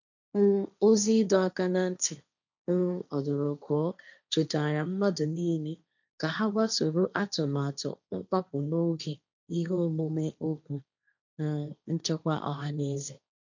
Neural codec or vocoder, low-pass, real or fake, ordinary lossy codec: codec, 16 kHz, 1.1 kbps, Voila-Tokenizer; 7.2 kHz; fake; none